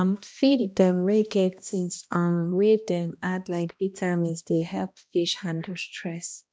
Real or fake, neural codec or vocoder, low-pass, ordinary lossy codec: fake; codec, 16 kHz, 1 kbps, X-Codec, HuBERT features, trained on balanced general audio; none; none